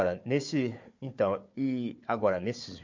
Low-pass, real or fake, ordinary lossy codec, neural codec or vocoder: 7.2 kHz; fake; MP3, 48 kbps; codec, 16 kHz, 4 kbps, FunCodec, trained on Chinese and English, 50 frames a second